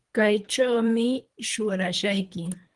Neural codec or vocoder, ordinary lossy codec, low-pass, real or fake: codec, 24 kHz, 3 kbps, HILCodec; Opus, 24 kbps; 10.8 kHz; fake